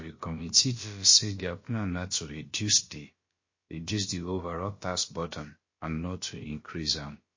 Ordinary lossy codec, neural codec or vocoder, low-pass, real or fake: MP3, 32 kbps; codec, 16 kHz, about 1 kbps, DyCAST, with the encoder's durations; 7.2 kHz; fake